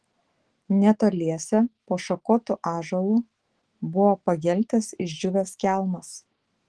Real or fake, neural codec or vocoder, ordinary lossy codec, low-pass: fake; autoencoder, 48 kHz, 128 numbers a frame, DAC-VAE, trained on Japanese speech; Opus, 16 kbps; 10.8 kHz